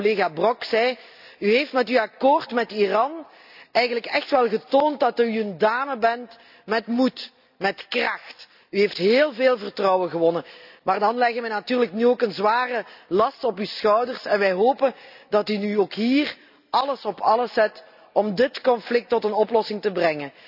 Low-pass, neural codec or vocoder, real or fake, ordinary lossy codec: 5.4 kHz; none; real; none